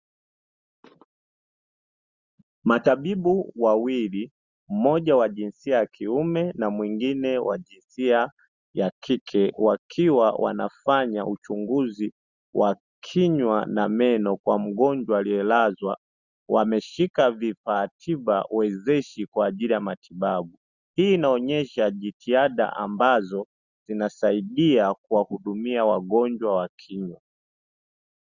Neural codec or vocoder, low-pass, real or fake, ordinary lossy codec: none; 7.2 kHz; real; Opus, 64 kbps